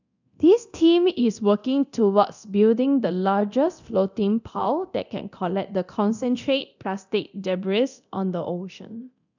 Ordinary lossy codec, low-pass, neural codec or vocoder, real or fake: none; 7.2 kHz; codec, 24 kHz, 0.9 kbps, DualCodec; fake